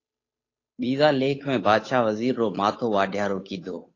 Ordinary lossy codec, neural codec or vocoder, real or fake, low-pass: AAC, 32 kbps; codec, 16 kHz, 8 kbps, FunCodec, trained on Chinese and English, 25 frames a second; fake; 7.2 kHz